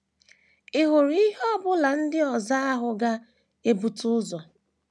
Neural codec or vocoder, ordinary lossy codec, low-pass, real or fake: none; none; none; real